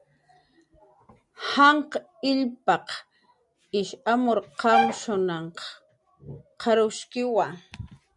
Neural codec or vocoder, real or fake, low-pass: none; real; 10.8 kHz